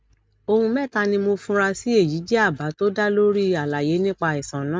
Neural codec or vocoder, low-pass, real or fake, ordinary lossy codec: none; none; real; none